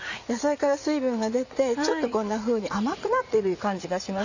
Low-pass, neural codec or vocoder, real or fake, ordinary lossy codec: 7.2 kHz; vocoder, 44.1 kHz, 80 mel bands, Vocos; fake; none